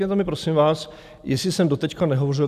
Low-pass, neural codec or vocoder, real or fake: 14.4 kHz; none; real